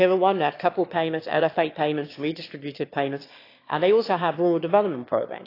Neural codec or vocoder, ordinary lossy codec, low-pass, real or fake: autoencoder, 22.05 kHz, a latent of 192 numbers a frame, VITS, trained on one speaker; AAC, 32 kbps; 5.4 kHz; fake